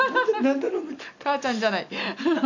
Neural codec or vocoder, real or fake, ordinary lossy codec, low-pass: none; real; none; 7.2 kHz